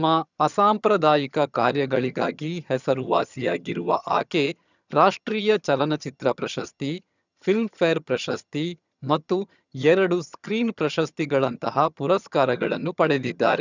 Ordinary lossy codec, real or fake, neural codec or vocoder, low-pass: none; fake; vocoder, 22.05 kHz, 80 mel bands, HiFi-GAN; 7.2 kHz